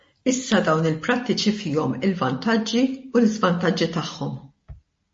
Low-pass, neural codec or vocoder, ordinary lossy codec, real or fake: 10.8 kHz; none; MP3, 32 kbps; real